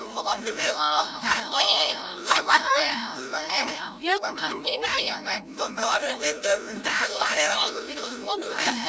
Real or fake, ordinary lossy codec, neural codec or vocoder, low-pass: fake; none; codec, 16 kHz, 0.5 kbps, FreqCodec, larger model; none